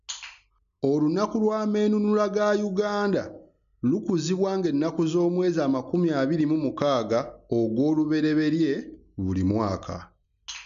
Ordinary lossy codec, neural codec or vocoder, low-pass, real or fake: MP3, 96 kbps; none; 7.2 kHz; real